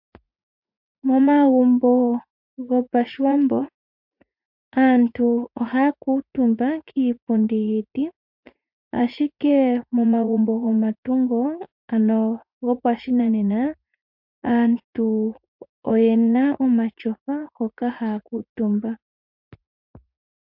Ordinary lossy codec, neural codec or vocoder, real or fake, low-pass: MP3, 48 kbps; vocoder, 44.1 kHz, 80 mel bands, Vocos; fake; 5.4 kHz